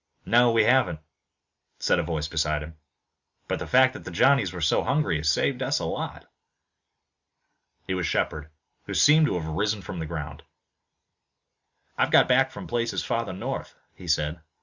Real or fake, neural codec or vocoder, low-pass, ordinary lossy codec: real; none; 7.2 kHz; Opus, 64 kbps